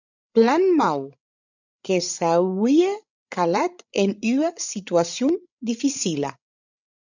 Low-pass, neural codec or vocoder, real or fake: 7.2 kHz; codec, 16 kHz, 16 kbps, FreqCodec, larger model; fake